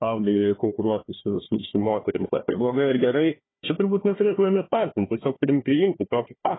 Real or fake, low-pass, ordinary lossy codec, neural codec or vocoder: fake; 7.2 kHz; AAC, 16 kbps; codec, 16 kHz, 1 kbps, FunCodec, trained on Chinese and English, 50 frames a second